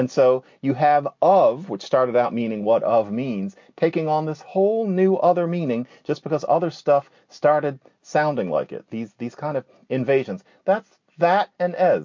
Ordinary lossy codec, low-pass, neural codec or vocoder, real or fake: MP3, 48 kbps; 7.2 kHz; none; real